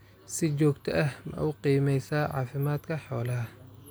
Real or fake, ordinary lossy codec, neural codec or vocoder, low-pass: real; none; none; none